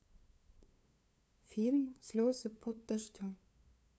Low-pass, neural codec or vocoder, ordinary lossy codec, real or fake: none; codec, 16 kHz, 2 kbps, FunCodec, trained on LibriTTS, 25 frames a second; none; fake